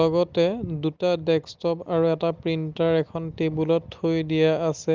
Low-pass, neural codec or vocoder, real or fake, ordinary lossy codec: 7.2 kHz; none; real; Opus, 32 kbps